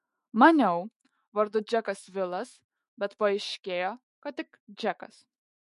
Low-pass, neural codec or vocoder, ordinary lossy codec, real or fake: 14.4 kHz; autoencoder, 48 kHz, 128 numbers a frame, DAC-VAE, trained on Japanese speech; MP3, 48 kbps; fake